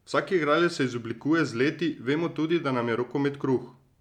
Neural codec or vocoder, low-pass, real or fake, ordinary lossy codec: none; 19.8 kHz; real; none